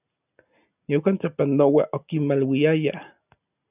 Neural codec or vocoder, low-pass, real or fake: none; 3.6 kHz; real